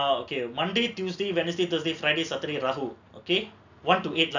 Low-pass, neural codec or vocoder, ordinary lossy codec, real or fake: 7.2 kHz; none; none; real